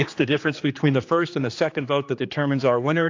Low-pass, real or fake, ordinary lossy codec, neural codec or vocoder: 7.2 kHz; fake; AAC, 48 kbps; codec, 16 kHz, 2 kbps, X-Codec, HuBERT features, trained on general audio